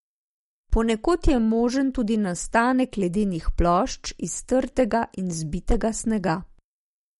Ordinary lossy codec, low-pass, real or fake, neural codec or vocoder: MP3, 48 kbps; 19.8 kHz; real; none